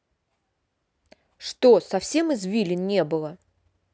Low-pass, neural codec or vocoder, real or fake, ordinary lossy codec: none; none; real; none